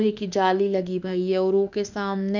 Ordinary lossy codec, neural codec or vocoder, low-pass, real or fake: none; autoencoder, 48 kHz, 32 numbers a frame, DAC-VAE, trained on Japanese speech; 7.2 kHz; fake